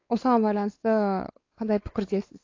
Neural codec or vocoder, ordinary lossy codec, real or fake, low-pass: codec, 16 kHz, 4 kbps, X-Codec, WavLM features, trained on Multilingual LibriSpeech; AAC, 32 kbps; fake; 7.2 kHz